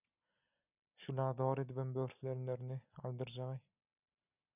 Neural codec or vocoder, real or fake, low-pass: none; real; 3.6 kHz